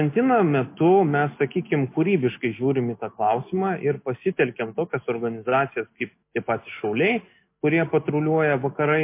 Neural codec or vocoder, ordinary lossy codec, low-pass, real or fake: none; MP3, 24 kbps; 3.6 kHz; real